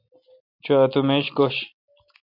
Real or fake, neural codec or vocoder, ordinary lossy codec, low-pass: real; none; MP3, 48 kbps; 5.4 kHz